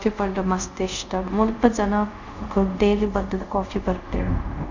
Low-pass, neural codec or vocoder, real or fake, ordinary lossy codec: 7.2 kHz; codec, 24 kHz, 0.5 kbps, DualCodec; fake; none